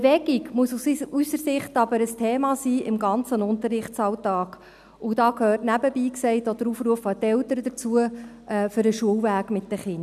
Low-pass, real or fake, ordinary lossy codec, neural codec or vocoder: 14.4 kHz; real; none; none